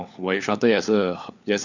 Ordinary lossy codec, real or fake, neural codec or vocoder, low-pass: none; fake; codec, 16 kHz, 4 kbps, FunCodec, trained on LibriTTS, 50 frames a second; 7.2 kHz